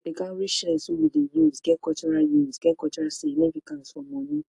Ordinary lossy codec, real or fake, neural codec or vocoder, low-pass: AAC, 64 kbps; real; none; 10.8 kHz